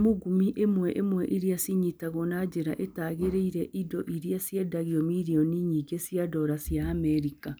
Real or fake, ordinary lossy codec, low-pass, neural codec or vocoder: real; none; none; none